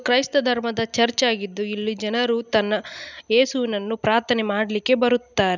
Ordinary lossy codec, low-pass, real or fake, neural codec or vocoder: none; 7.2 kHz; real; none